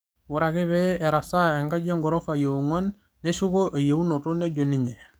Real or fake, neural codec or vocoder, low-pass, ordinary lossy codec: fake; codec, 44.1 kHz, 7.8 kbps, DAC; none; none